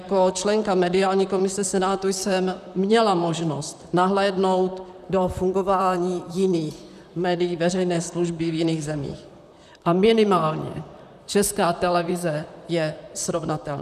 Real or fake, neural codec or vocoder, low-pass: fake; vocoder, 44.1 kHz, 128 mel bands, Pupu-Vocoder; 14.4 kHz